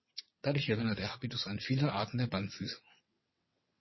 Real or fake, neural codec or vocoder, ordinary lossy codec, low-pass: fake; vocoder, 22.05 kHz, 80 mel bands, WaveNeXt; MP3, 24 kbps; 7.2 kHz